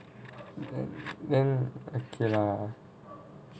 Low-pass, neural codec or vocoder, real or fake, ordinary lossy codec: none; none; real; none